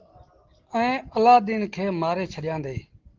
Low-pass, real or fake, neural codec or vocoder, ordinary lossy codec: 7.2 kHz; real; none; Opus, 16 kbps